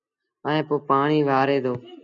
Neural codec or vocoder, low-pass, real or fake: none; 7.2 kHz; real